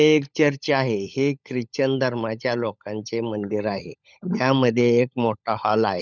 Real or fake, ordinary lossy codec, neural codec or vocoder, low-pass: fake; none; codec, 16 kHz, 16 kbps, FunCodec, trained on LibriTTS, 50 frames a second; 7.2 kHz